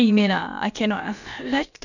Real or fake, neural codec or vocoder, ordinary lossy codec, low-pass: fake; codec, 16 kHz, about 1 kbps, DyCAST, with the encoder's durations; none; 7.2 kHz